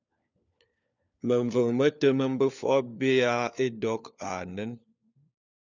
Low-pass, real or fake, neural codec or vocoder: 7.2 kHz; fake; codec, 16 kHz, 4 kbps, FunCodec, trained on LibriTTS, 50 frames a second